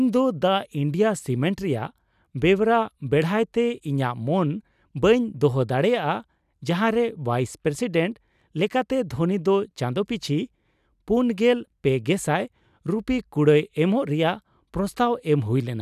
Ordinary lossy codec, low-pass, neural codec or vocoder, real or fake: none; 14.4 kHz; none; real